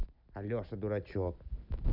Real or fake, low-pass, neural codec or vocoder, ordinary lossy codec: real; 5.4 kHz; none; none